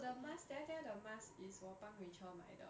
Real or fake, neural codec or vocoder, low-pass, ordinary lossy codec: real; none; none; none